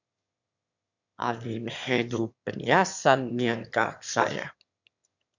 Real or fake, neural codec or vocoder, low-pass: fake; autoencoder, 22.05 kHz, a latent of 192 numbers a frame, VITS, trained on one speaker; 7.2 kHz